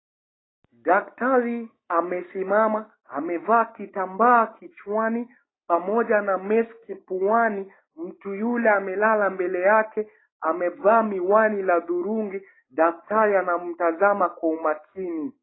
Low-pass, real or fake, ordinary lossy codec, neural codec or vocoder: 7.2 kHz; real; AAC, 16 kbps; none